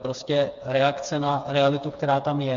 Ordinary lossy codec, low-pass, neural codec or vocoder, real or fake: Opus, 64 kbps; 7.2 kHz; codec, 16 kHz, 4 kbps, FreqCodec, smaller model; fake